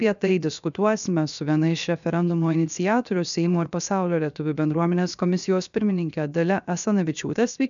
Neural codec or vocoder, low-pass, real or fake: codec, 16 kHz, 0.7 kbps, FocalCodec; 7.2 kHz; fake